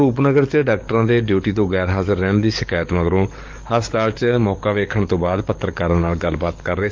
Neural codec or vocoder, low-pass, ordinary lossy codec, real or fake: codec, 44.1 kHz, 7.8 kbps, DAC; 7.2 kHz; Opus, 32 kbps; fake